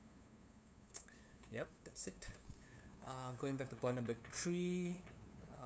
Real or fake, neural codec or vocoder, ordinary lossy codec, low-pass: fake; codec, 16 kHz, 2 kbps, FunCodec, trained on LibriTTS, 25 frames a second; none; none